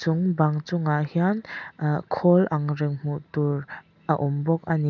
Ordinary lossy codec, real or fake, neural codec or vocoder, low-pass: none; real; none; 7.2 kHz